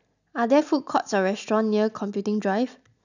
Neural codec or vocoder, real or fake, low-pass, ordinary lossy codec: none; real; 7.2 kHz; none